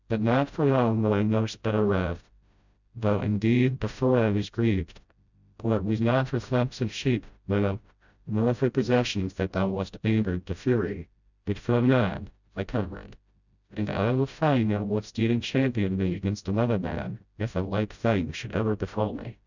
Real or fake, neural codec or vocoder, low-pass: fake; codec, 16 kHz, 0.5 kbps, FreqCodec, smaller model; 7.2 kHz